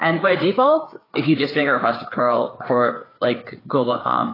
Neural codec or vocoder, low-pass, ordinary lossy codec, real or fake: codec, 16 kHz, 4 kbps, FreqCodec, larger model; 5.4 kHz; AAC, 24 kbps; fake